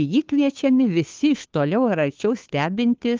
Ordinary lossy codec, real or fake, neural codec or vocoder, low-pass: Opus, 24 kbps; fake; codec, 16 kHz, 2 kbps, FunCodec, trained on Chinese and English, 25 frames a second; 7.2 kHz